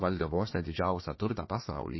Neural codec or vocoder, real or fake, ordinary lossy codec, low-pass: codec, 16 kHz, 1 kbps, FunCodec, trained on Chinese and English, 50 frames a second; fake; MP3, 24 kbps; 7.2 kHz